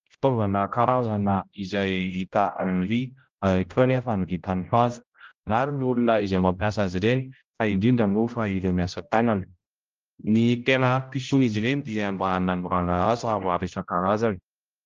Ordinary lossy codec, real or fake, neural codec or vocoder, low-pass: Opus, 24 kbps; fake; codec, 16 kHz, 0.5 kbps, X-Codec, HuBERT features, trained on general audio; 7.2 kHz